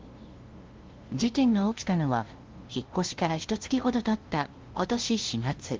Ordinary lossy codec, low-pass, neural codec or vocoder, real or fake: Opus, 16 kbps; 7.2 kHz; codec, 16 kHz, 0.5 kbps, FunCodec, trained on LibriTTS, 25 frames a second; fake